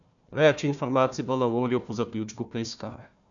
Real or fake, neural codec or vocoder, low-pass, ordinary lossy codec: fake; codec, 16 kHz, 1 kbps, FunCodec, trained on Chinese and English, 50 frames a second; 7.2 kHz; none